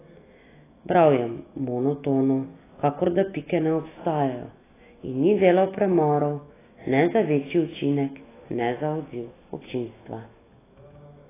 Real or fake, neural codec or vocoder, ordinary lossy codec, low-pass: fake; autoencoder, 48 kHz, 128 numbers a frame, DAC-VAE, trained on Japanese speech; AAC, 16 kbps; 3.6 kHz